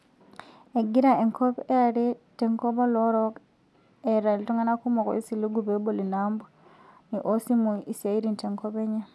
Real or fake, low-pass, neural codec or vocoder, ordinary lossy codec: real; none; none; none